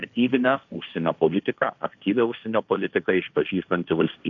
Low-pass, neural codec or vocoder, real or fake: 7.2 kHz; codec, 16 kHz, 1.1 kbps, Voila-Tokenizer; fake